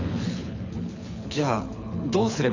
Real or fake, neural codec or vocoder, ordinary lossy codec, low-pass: fake; codec, 24 kHz, 6 kbps, HILCodec; none; 7.2 kHz